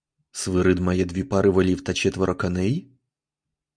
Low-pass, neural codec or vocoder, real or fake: 9.9 kHz; none; real